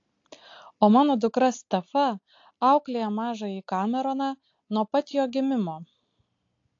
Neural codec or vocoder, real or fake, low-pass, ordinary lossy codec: none; real; 7.2 kHz; AAC, 48 kbps